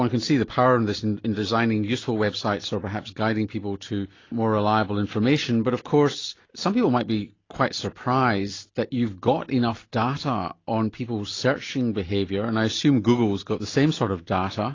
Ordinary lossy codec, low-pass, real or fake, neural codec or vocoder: AAC, 32 kbps; 7.2 kHz; real; none